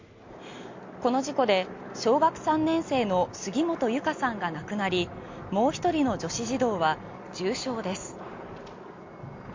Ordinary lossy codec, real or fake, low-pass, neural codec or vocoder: MP3, 48 kbps; real; 7.2 kHz; none